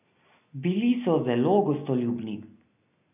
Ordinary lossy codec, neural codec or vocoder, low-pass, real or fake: none; none; 3.6 kHz; real